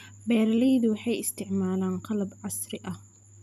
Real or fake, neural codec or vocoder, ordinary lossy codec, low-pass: real; none; none; 14.4 kHz